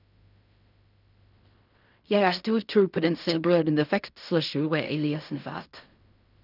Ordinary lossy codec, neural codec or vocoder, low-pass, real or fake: none; codec, 16 kHz in and 24 kHz out, 0.4 kbps, LongCat-Audio-Codec, fine tuned four codebook decoder; 5.4 kHz; fake